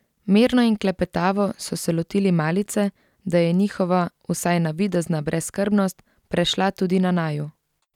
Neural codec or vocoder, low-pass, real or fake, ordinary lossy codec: none; 19.8 kHz; real; none